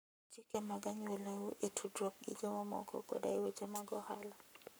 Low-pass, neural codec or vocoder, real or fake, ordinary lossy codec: none; codec, 44.1 kHz, 7.8 kbps, Pupu-Codec; fake; none